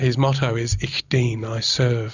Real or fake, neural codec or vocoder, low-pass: real; none; 7.2 kHz